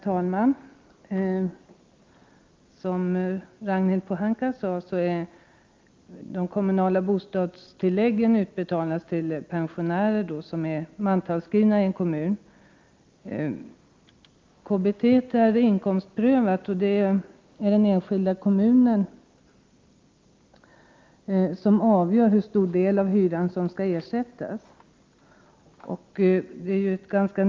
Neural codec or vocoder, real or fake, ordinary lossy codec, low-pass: none; real; Opus, 24 kbps; 7.2 kHz